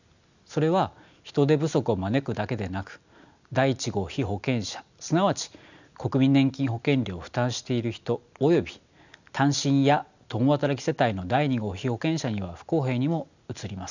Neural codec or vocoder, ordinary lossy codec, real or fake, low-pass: none; none; real; 7.2 kHz